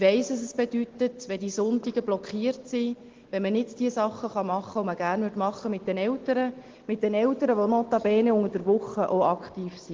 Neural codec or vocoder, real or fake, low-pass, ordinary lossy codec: none; real; 7.2 kHz; Opus, 16 kbps